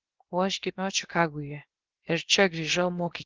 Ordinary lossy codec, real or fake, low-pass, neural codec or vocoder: Opus, 16 kbps; fake; 7.2 kHz; codec, 16 kHz, about 1 kbps, DyCAST, with the encoder's durations